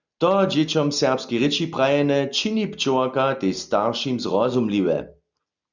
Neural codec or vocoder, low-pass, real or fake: none; 7.2 kHz; real